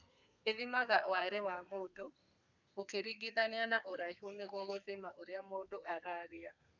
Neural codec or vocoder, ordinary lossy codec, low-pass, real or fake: codec, 32 kHz, 1.9 kbps, SNAC; none; 7.2 kHz; fake